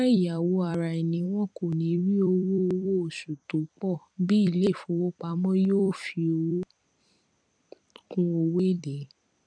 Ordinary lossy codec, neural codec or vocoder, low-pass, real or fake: none; vocoder, 44.1 kHz, 128 mel bands every 256 samples, BigVGAN v2; 9.9 kHz; fake